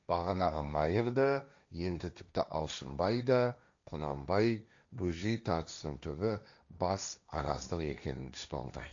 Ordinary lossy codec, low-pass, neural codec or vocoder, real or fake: MP3, 64 kbps; 7.2 kHz; codec, 16 kHz, 1.1 kbps, Voila-Tokenizer; fake